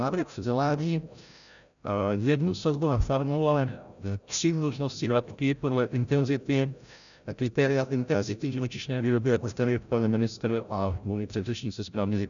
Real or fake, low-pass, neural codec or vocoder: fake; 7.2 kHz; codec, 16 kHz, 0.5 kbps, FreqCodec, larger model